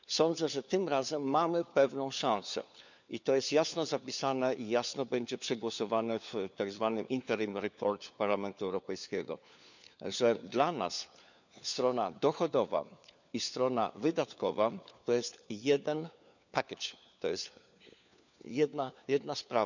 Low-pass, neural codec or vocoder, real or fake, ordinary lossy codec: 7.2 kHz; codec, 16 kHz, 4 kbps, FunCodec, trained on LibriTTS, 50 frames a second; fake; none